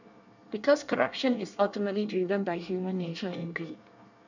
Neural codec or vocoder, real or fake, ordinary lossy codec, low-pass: codec, 24 kHz, 1 kbps, SNAC; fake; none; 7.2 kHz